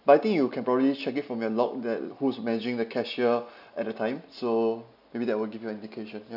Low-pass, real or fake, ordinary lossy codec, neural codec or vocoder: 5.4 kHz; real; none; none